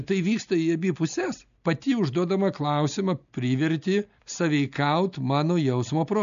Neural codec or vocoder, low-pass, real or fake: none; 7.2 kHz; real